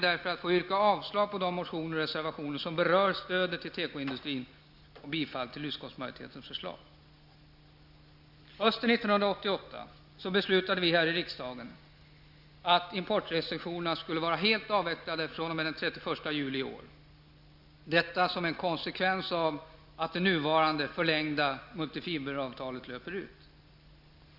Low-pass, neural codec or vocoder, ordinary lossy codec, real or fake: 5.4 kHz; none; Opus, 64 kbps; real